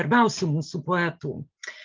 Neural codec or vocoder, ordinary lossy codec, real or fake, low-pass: none; Opus, 24 kbps; real; 7.2 kHz